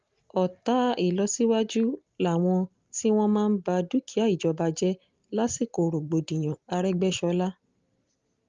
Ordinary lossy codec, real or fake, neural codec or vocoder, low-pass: Opus, 32 kbps; real; none; 7.2 kHz